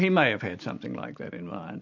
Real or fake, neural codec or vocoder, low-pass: real; none; 7.2 kHz